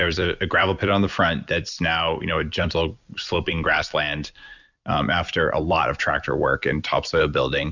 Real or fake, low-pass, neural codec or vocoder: fake; 7.2 kHz; vocoder, 44.1 kHz, 128 mel bands every 256 samples, BigVGAN v2